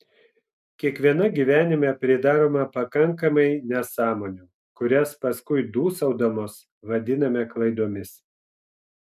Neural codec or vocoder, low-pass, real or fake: none; 14.4 kHz; real